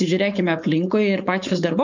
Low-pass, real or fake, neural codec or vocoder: 7.2 kHz; fake; codec, 16 kHz, 4.8 kbps, FACodec